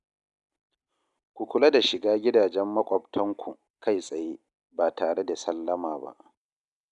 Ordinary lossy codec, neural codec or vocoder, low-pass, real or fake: none; none; 10.8 kHz; real